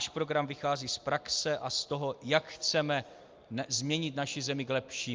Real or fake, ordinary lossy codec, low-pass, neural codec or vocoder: real; Opus, 24 kbps; 7.2 kHz; none